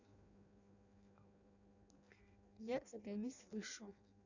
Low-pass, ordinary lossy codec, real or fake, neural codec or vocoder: 7.2 kHz; none; fake; codec, 16 kHz in and 24 kHz out, 0.6 kbps, FireRedTTS-2 codec